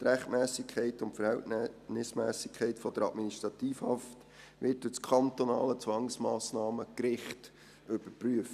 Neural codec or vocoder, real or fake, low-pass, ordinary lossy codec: none; real; 14.4 kHz; none